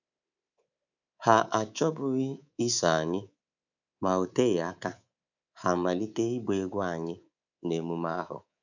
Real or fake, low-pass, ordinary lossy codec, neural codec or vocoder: fake; 7.2 kHz; none; codec, 24 kHz, 3.1 kbps, DualCodec